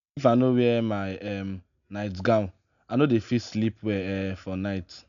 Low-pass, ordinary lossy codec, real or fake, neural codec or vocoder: 7.2 kHz; none; real; none